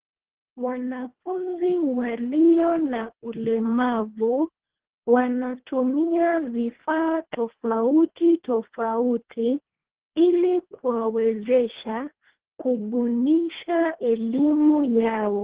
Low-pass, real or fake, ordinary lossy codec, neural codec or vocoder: 3.6 kHz; fake; Opus, 16 kbps; codec, 24 kHz, 1.5 kbps, HILCodec